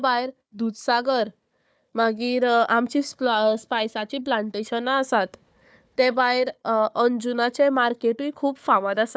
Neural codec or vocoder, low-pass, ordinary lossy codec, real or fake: codec, 16 kHz, 4 kbps, FunCodec, trained on Chinese and English, 50 frames a second; none; none; fake